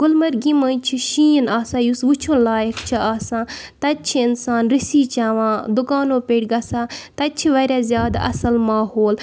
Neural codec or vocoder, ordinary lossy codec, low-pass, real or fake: none; none; none; real